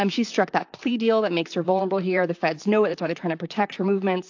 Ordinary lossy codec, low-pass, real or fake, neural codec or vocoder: AAC, 48 kbps; 7.2 kHz; fake; vocoder, 44.1 kHz, 128 mel bands, Pupu-Vocoder